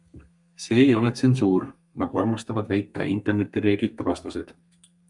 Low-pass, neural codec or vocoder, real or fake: 10.8 kHz; codec, 32 kHz, 1.9 kbps, SNAC; fake